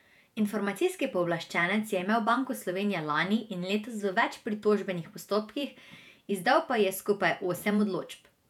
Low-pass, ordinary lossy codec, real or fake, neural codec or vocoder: 19.8 kHz; none; fake; vocoder, 48 kHz, 128 mel bands, Vocos